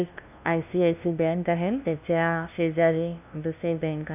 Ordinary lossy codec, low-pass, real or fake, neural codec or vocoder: none; 3.6 kHz; fake; codec, 16 kHz, 0.5 kbps, FunCodec, trained on LibriTTS, 25 frames a second